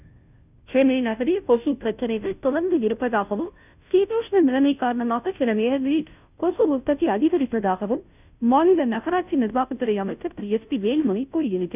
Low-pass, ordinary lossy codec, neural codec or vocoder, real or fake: 3.6 kHz; none; codec, 16 kHz, 0.5 kbps, FunCodec, trained on Chinese and English, 25 frames a second; fake